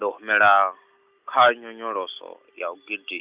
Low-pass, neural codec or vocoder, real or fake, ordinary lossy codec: 3.6 kHz; none; real; Opus, 64 kbps